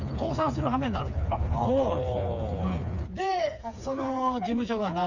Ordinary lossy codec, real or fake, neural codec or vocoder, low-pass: none; fake; codec, 16 kHz, 4 kbps, FreqCodec, smaller model; 7.2 kHz